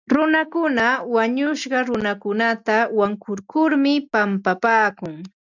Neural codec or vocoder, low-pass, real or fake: none; 7.2 kHz; real